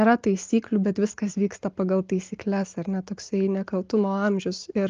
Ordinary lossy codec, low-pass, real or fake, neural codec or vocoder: Opus, 32 kbps; 7.2 kHz; real; none